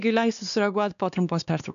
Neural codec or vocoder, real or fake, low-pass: codec, 16 kHz, 2 kbps, X-Codec, WavLM features, trained on Multilingual LibriSpeech; fake; 7.2 kHz